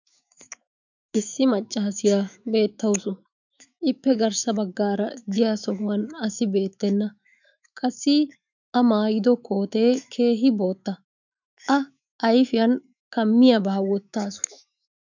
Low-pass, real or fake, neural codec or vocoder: 7.2 kHz; fake; autoencoder, 48 kHz, 128 numbers a frame, DAC-VAE, trained on Japanese speech